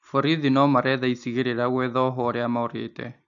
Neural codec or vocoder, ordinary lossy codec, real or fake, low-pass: none; AAC, 64 kbps; real; 7.2 kHz